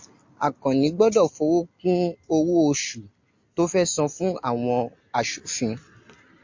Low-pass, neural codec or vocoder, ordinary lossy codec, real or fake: 7.2 kHz; none; MP3, 48 kbps; real